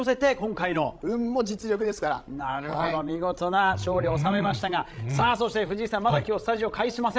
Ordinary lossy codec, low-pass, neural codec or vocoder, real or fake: none; none; codec, 16 kHz, 16 kbps, FreqCodec, larger model; fake